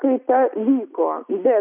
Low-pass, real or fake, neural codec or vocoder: 3.6 kHz; real; none